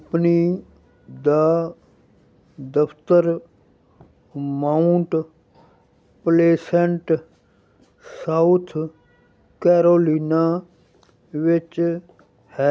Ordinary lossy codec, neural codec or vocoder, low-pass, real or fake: none; none; none; real